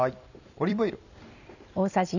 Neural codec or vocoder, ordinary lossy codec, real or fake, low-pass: vocoder, 44.1 kHz, 128 mel bands every 256 samples, BigVGAN v2; none; fake; 7.2 kHz